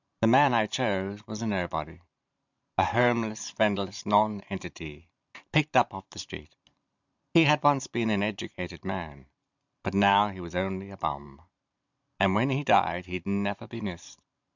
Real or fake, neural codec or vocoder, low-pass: fake; vocoder, 44.1 kHz, 128 mel bands every 512 samples, BigVGAN v2; 7.2 kHz